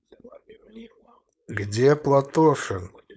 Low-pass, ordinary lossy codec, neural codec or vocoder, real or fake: none; none; codec, 16 kHz, 4.8 kbps, FACodec; fake